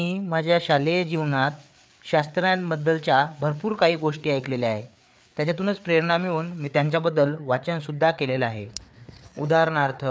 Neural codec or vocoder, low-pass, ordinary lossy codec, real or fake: codec, 16 kHz, 4 kbps, FreqCodec, larger model; none; none; fake